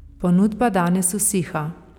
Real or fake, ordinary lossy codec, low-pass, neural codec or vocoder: real; none; 19.8 kHz; none